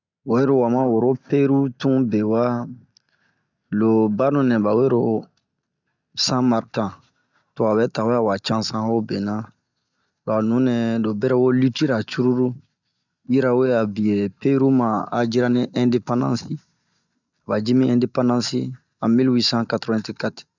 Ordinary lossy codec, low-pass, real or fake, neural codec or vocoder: none; 7.2 kHz; real; none